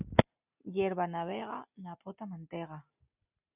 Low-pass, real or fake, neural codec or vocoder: 3.6 kHz; real; none